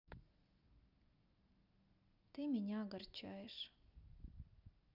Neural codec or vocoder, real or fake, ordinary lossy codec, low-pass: none; real; none; 5.4 kHz